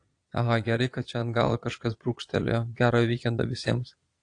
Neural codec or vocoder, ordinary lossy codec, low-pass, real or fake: vocoder, 22.05 kHz, 80 mel bands, Vocos; AAC, 48 kbps; 9.9 kHz; fake